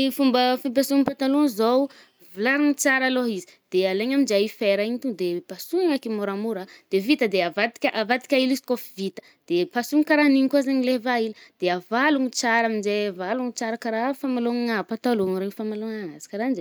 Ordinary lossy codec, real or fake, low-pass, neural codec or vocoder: none; real; none; none